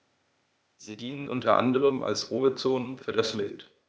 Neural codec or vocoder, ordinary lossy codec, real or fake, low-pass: codec, 16 kHz, 0.8 kbps, ZipCodec; none; fake; none